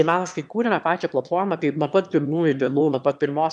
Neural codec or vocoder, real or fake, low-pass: autoencoder, 22.05 kHz, a latent of 192 numbers a frame, VITS, trained on one speaker; fake; 9.9 kHz